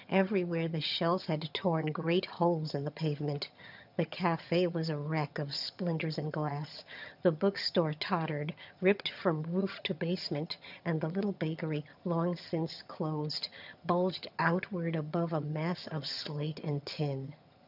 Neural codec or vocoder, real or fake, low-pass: vocoder, 22.05 kHz, 80 mel bands, HiFi-GAN; fake; 5.4 kHz